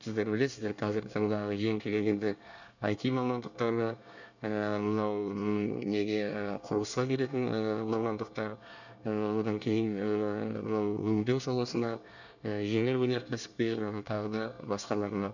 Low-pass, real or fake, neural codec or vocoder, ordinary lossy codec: 7.2 kHz; fake; codec, 24 kHz, 1 kbps, SNAC; none